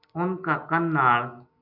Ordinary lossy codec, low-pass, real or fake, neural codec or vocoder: MP3, 48 kbps; 5.4 kHz; real; none